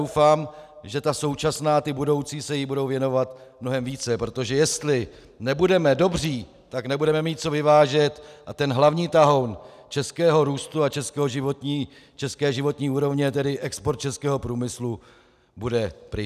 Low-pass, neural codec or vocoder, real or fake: 14.4 kHz; none; real